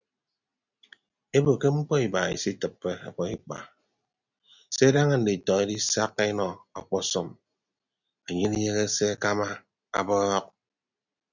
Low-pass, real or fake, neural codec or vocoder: 7.2 kHz; real; none